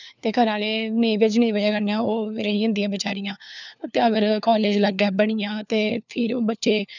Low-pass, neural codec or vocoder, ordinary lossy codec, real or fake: 7.2 kHz; codec, 16 kHz, 4 kbps, FunCodec, trained on LibriTTS, 50 frames a second; none; fake